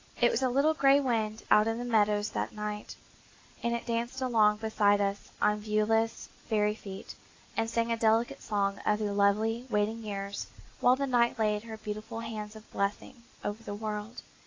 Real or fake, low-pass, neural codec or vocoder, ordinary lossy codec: real; 7.2 kHz; none; AAC, 32 kbps